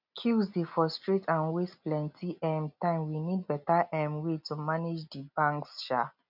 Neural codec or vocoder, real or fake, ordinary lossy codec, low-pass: none; real; none; 5.4 kHz